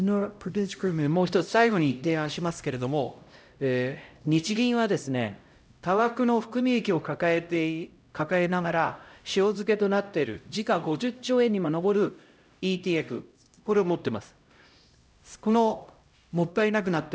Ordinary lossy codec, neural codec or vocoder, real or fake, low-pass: none; codec, 16 kHz, 0.5 kbps, X-Codec, HuBERT features, trained on LibriSpeech; fake; none